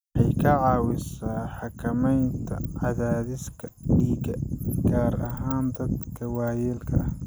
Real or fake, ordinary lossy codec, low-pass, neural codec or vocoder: real; none; none; none